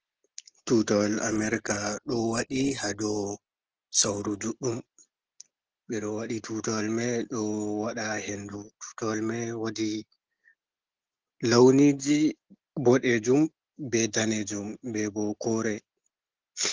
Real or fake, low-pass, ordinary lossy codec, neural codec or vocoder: real; 7.2 kHz; Opus, 16 kbps; none